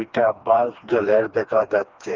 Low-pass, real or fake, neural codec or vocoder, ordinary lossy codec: 7.2 kHz; fake; codec, 16 kHz, 2 kbps, FreqCodec, smaller model; Opus, 24 kbps